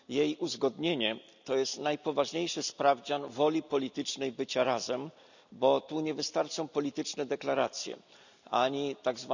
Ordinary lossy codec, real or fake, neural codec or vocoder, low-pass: none; real; none; 7.2 kHz